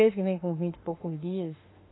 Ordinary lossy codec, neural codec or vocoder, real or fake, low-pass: AAC, 16 kbps; autoencoder, 48 kHz, 32 numbers a frame, DAC-VAE, trained on Japanese speech; fake; 7.2 kHz